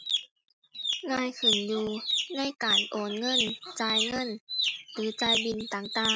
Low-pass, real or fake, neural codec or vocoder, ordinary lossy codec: none; real; none; none